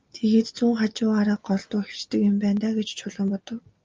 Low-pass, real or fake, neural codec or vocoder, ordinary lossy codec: 7.2 kHz; real; none; Opus, 24 kbps